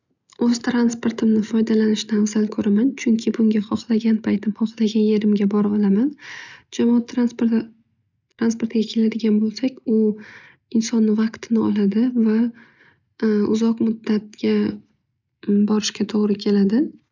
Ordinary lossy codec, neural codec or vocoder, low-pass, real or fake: none; none; 7.2 kHz; real